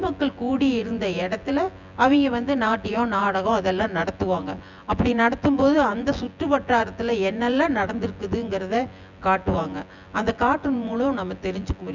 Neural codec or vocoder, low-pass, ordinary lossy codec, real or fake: vocoder, 24 kHz, 100 mel bands, Vocos; 7.2 kHz; none; fake